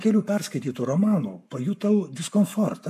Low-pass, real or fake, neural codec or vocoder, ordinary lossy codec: 14.4 kHz; fake; codec, 44.1 kHz, 7.8 kbps, Pupu-Codec; AAC, 96 kbps